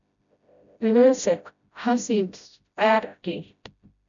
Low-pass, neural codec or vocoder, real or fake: 7.2 kHz; codec, 16 kHz, 0.5 kbps, FreqCodec, smaller model; fake